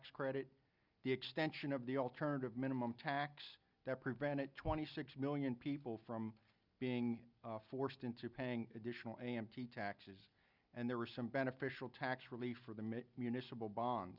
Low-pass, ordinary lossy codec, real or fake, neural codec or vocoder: 5.4 kHz; Opus, 64 kbps; real; none